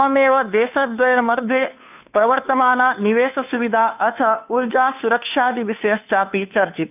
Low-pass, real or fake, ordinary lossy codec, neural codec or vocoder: 3.6 kHz; fake; none; codec, 16 kHz, 2 kbps, FunCodec, trained on Chinese and English, 25 frames a second